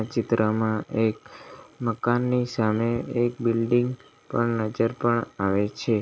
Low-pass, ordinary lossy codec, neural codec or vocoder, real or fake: none; none; none; real